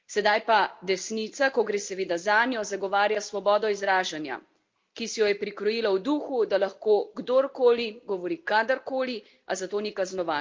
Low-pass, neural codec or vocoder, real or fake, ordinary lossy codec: 7.2 kHz; none; real; Opus, 24 kbps